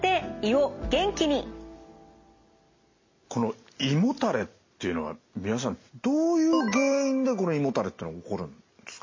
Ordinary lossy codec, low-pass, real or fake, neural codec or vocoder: MP3, 32 kbps; 7.2 kHz; real; none